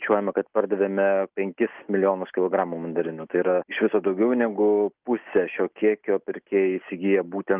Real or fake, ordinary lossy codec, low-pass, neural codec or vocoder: real; Opus, 32 kbps; 3.6 kHz; none